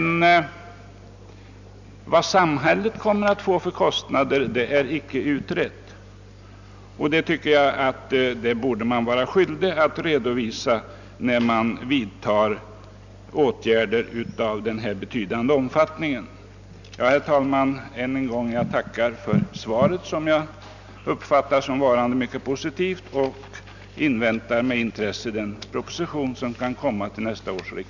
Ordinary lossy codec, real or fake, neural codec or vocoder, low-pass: none; real; none; 7.2 kHz